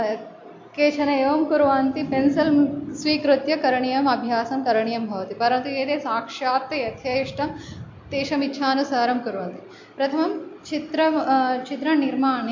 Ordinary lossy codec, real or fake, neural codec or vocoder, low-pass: MP3, 48 kbps; real; none; 7.2 kHz